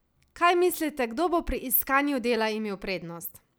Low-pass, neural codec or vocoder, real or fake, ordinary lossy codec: none; none; real; none